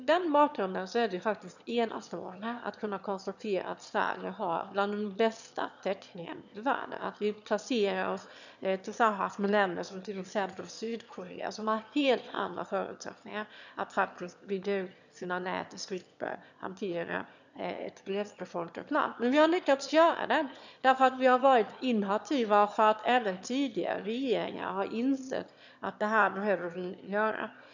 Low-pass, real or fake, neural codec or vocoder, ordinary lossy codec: 7.2 kHz; fake; autoencoder, 22.05 kHz, a latent of 192 numbers a frame, VITS, trained on one speaker; none